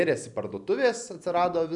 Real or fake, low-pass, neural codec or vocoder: real; 10.8 kHz; none